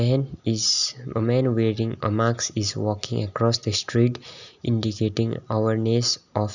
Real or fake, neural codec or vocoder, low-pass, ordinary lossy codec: real; none; 7.2 kHz; none